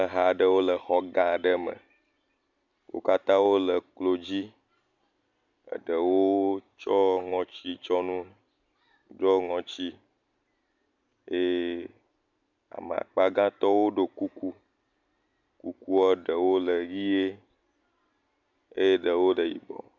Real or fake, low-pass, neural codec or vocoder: real; 7.2 kHz; none